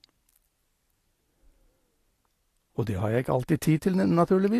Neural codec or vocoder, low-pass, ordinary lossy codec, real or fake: none; 14.4 kHz; AAC, 48 kbps; real